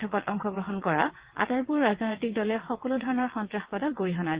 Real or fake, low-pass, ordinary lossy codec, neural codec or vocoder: fake; 3.6 kHz; Opus, 16 kbps; vocoder, 22.05 kHz, 80 mel bands, WaveNeXt